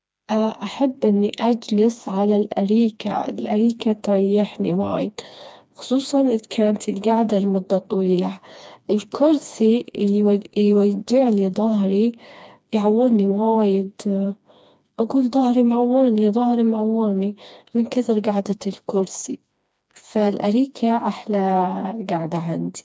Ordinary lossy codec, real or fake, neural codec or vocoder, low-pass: none; fake; codec, 16 kHz, 2 kbps, FreqCodec, smaller model; none